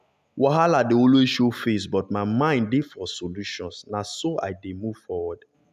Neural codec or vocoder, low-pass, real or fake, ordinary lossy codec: none; 14.4 kHz; real; none